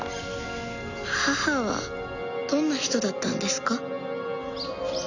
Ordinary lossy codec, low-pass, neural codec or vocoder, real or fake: none; 7.2 kHz; none; real